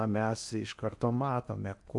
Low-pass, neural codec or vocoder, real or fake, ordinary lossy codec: 10.8 kHz; codec, 16 kHz in and 24 kHz out, 0.8 kbps, FocalCodec, streaming, 65536 codes; fake; AAC, 64 kbps